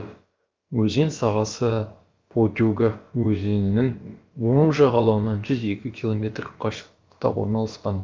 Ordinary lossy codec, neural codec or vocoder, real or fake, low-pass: Opus, 32 kbps; codec, 16 kHz, about 1 kbps, DyCAST, with the encoder's durations; fake; 7.2 kHz